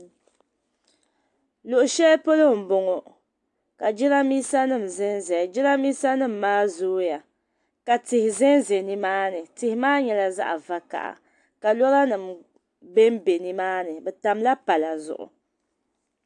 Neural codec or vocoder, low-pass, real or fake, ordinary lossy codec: none; 10.8 kHz; real; AAC, 64 kbps